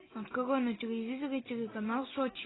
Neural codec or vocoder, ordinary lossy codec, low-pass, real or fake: none; AAC, 16 kbps; 7.2 kHz; real